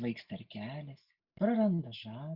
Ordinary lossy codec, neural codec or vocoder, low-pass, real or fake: Opus, 64 kbps; none; 5.4 kHz; real